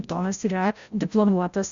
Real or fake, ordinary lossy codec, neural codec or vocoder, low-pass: fake; Opus, 64 kbps; codec, 16 kHz, 0.5 kbps, FreqCodec, larger model; 7.2 kHz